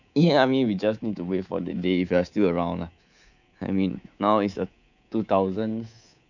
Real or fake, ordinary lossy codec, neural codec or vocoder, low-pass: fake; none; codec, 24 kHz, 3.1 kbps, DualCodec; 7.2 kHz